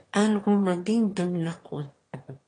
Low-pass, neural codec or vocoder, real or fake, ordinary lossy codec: 9.9 kHz; autoencoder, 22.05 kHz, a latent of 192 numbers a frame, VITS, trained on one speaker; fake; AAC, 32 kbps